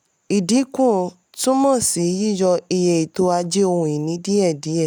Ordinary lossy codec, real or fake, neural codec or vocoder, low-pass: none; real; none; none